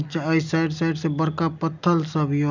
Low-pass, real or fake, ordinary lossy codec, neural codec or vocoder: 7.2 kHz; real; none; none